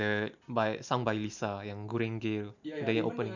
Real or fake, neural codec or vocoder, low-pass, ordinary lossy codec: real; none; 7.2 kHz; none